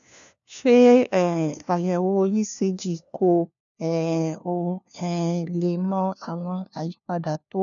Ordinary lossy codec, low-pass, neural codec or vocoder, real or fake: none; 7.2 kHz; codec, 16 kHz, 1 kbps, FunCodec, trained on LibriTTS, 50 frames a second; fake